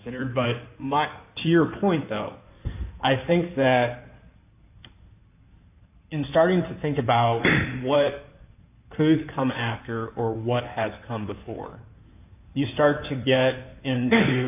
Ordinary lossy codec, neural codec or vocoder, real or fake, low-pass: AAC, 32 kbps; codec, 16 kHz in and 24 kHz out, 2.2 kbps, FireRedTTS-2 codec; fake; 3.6 kHz